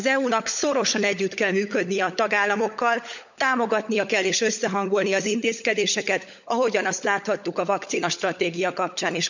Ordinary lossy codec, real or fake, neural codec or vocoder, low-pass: none; fake; codec, 16 kHz, 16 kbps, FunCodec, trained on LibriTTS, 50 frames a second; 7.2 kHz